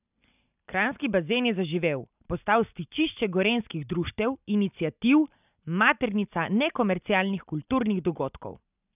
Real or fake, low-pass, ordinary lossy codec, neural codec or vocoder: real; 3.6 kHz; none; none